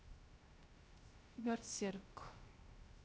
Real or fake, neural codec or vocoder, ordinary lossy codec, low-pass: fake; codec, 16 kHz, 0.3 kbps, FocalCodec; none; none